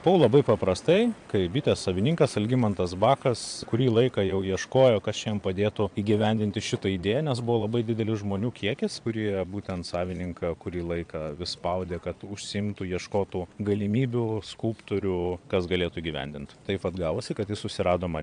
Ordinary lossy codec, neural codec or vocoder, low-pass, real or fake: AAC, 64 kbps; vocoder, 22.05 kHz, 80 mel bands, Vocos; 9.9 kHz; fake